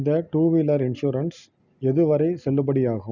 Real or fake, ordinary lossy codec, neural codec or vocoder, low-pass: real; none; none; 7.2 kHz